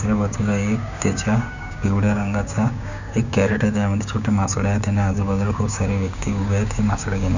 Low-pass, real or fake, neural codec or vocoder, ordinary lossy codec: 7.2 kHz; fake; codec, 16 kHz, 6 kbps, DAC; none